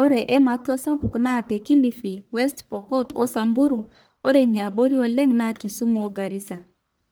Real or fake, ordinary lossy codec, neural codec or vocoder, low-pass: fake; none; codec, 44.1 kHz, 1.7 kbps, Pupu-Codec; none